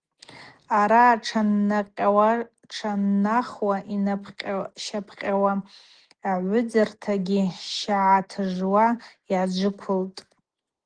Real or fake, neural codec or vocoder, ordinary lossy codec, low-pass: real; none; Opus, 16 kbps; 9.9 kHz